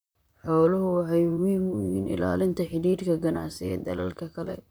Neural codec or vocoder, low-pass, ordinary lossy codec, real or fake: vocoder, 44.1 kHz, 128 mel bands, Pupu-Vocoder; none; none; fake